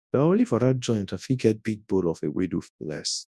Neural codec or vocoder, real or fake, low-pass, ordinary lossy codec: codec, 24 kHz, 0.9 kbps, WavTokenizer, large speech release; fake; none; none